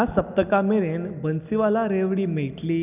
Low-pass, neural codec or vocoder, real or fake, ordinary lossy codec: 3.6 kHz; none; real; none